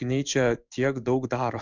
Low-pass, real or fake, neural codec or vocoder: 7.2 kHz; fake; codec, 16 kHz in and 24 kHz out, 1 kbps, XY-Tokenizer